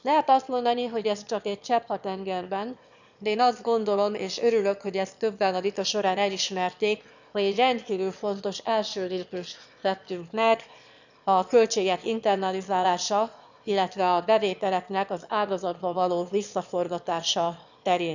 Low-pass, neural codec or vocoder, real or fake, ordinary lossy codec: 7.2 kHz; autoencoder, 22.05 kHz, a latent of 192 numbers a frame, VITS, trained on one speaker; fake; none